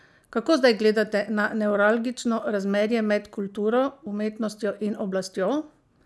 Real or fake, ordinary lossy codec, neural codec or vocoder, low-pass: real; none; none; none